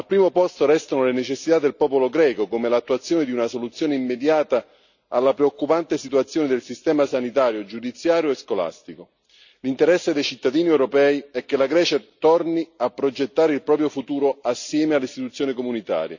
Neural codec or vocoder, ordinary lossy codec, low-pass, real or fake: none; none; 7.2 kHz; real